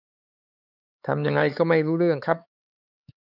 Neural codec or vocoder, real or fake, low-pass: codec, 16 kHz, 4 kbps, X-Codec, HuBERT features, trained on LibriSpeech; fake; 5.4 kHz